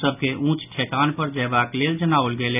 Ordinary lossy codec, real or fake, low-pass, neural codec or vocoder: none; real; 3.6 kHz; none